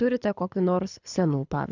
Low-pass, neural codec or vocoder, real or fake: 7.2 kHz; codec, 24 kHz, 6 kbps, HILCodec; fake